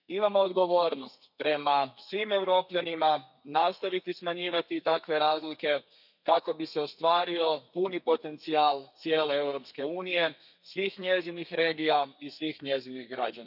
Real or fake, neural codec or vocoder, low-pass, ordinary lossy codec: fake; codec, 32 kHz, 1.9 kbps, SNAC; 5.4 kHz; none